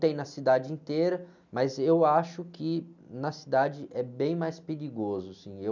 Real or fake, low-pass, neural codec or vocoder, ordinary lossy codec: real; 7.2 kHz; none; none